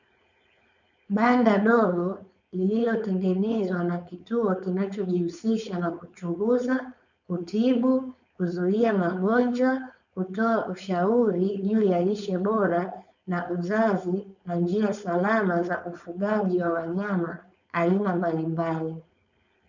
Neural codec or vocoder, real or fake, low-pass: codec, 16 kHz, 4.8 kbps, FACodec; fake; 7.2 kHz